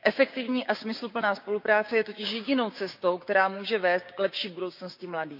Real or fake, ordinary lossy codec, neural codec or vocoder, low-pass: fake; none; codec, 16 kHz, 6 kbps, DAC; 5.4 kHz